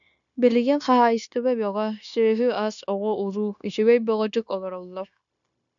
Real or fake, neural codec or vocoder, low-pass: fake; codec, 16 kHz, 0.9 kbps, LongCat-Audio-Codec; 7.2 kHz